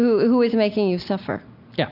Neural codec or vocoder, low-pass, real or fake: none; 5.4 kHz; real